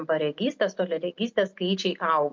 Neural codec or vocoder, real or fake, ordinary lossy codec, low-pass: none; real; MP3, 48 kbps; 7.2 kHz